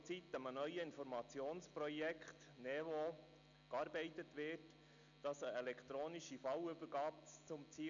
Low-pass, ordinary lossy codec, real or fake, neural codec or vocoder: 7.2 kHz; none; real; none